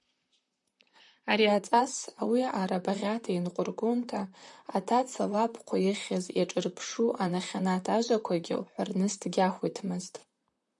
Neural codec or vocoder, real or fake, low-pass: vocoder, 44.1 kHz, 128 mel bands, Pupu-Vocoder; fake; 10.8 kHz